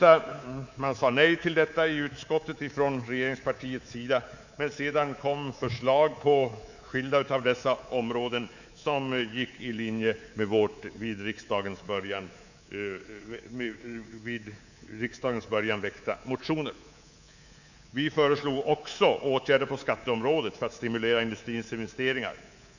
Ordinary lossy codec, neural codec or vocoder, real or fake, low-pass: none; codec, 24 kHz, 3.1 kbps, DualCodec; fake; 7.2 kHz